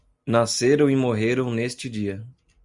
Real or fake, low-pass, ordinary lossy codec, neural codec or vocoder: real; 10.8 kHz; Opus, 64 kbps; none